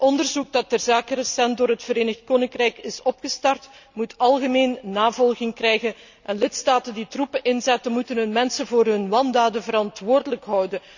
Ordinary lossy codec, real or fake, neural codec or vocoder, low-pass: none; real; none; 7.2 kHz